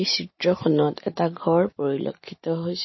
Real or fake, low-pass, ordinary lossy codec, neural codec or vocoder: real; 7.2 kHz; MP3, 24 kbps; none